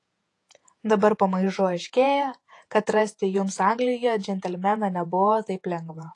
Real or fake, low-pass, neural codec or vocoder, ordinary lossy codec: fake; 10.8 kHz; vocoder, 48 kHz, 128 mel bands, Vocos; AAC, 48 kbps